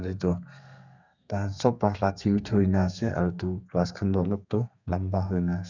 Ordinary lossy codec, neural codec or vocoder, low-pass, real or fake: none; codec, 32 kHz, 1.9 kbps, SNAC; 7.2 kHz; fake